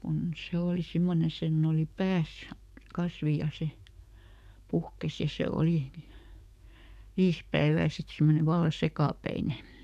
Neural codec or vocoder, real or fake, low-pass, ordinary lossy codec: codec, 44.1 kHz, 7.8 kbps, DAC; fake; 14.4 kHz; none